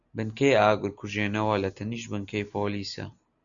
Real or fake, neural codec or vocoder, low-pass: real; none; 7.2 kHz